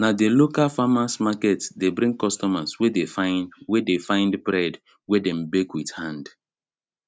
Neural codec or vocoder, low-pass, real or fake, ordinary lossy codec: none; none; real; none